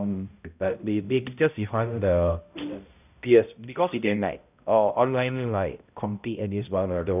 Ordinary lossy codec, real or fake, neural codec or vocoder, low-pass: none; fake; codec, 16 kHz, 0.5 kbps, X-Codec, HuBERT features, trained on balanced general audio; 3.6 kHz